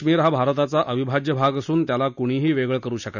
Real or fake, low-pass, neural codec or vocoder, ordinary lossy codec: real; 7.2 kHz; none; none